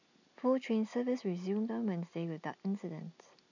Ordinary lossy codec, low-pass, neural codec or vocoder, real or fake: none; 7.2 kHz; none; real